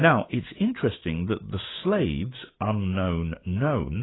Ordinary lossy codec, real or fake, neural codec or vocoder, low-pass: AAC, 16 kbps; fake; codec, 16 kHz, 6 kbps, DAC; 7.2 kHz